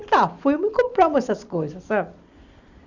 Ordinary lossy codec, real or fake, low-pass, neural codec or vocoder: Opus, 64 kbps; real; 7.2 kHz; none